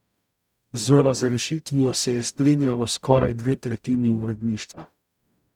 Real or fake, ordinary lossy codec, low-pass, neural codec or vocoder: fake; none; 19.8 kHz; codec, 44.1 kHz, 0.9 kbps, DAC